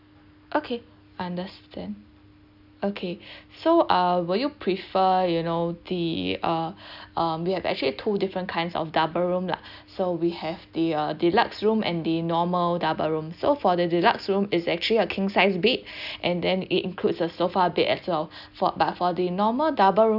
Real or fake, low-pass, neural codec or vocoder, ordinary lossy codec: real; 5.4 kHz; none; none